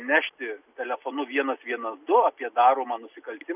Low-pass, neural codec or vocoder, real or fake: 3.6 kHz; none; real